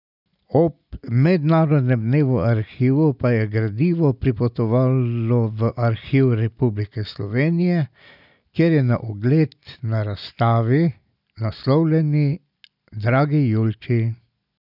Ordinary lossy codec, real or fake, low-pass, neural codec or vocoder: none; real; 5.4 kHz; none